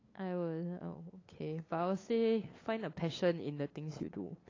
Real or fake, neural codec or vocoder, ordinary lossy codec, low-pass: fake; codec, 16 kHz, 8 kbps, FunCodec, trained on LibriTTS, 25 frames a second; AAC, 32 kbps; 7.2 kHz